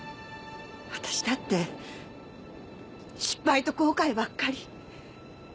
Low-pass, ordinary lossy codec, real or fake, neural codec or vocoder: none; none; real; none